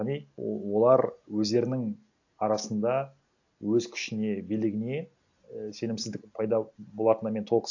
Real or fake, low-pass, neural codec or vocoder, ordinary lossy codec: real; 7.2 kHz; none; AAC, 48 kbps